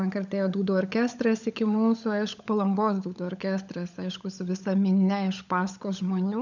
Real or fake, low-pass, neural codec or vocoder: fake; 7.2 kHz; codec, 16 kHz, 16 kbps, FunCodec, trained on LibriTTS, 50 frames a second